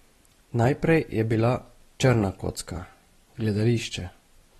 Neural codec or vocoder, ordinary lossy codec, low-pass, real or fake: none; AAC, 32 kbps; 19.8 kHz; real